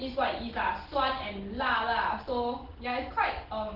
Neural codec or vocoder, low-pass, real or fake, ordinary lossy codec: none; 5.4 kHz; real; Opus, 16 kbps